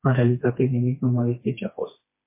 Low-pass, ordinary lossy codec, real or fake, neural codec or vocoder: 3.6 kHz; MP3, 24 kbps; fake; codec, 16 kHz, 2 kbps, FreqCodec, smaller model